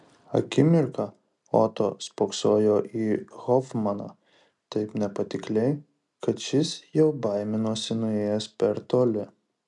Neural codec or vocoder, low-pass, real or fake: none; 10.8 kHz; real